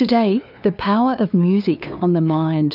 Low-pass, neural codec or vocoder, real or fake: 5.4 kHz; codec, 16 kHz, 2 kbps, FunCodec, trained on LibriTTS, 25 frames a second; fake